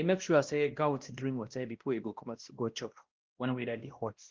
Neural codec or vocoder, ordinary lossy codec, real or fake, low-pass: codec, 16 kHz, 1 kbps, X-Codec, WavLM features, trained on Multilingual LibriSpeech; Opus, 16 kbps; fake; 7.2 kHz